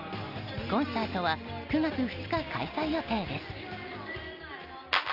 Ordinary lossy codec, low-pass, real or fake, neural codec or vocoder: Opus, 32 kbps; 5.4 kHz; real; none